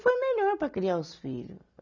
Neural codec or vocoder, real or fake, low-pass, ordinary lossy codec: none; real; 7.2 kHz; none